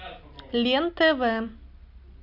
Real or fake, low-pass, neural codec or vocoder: real; 5.4 kHz; none